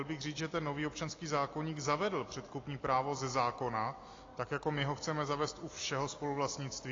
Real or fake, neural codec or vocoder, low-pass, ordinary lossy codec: real; none; 7.2 kHz; AAC, 32 kbps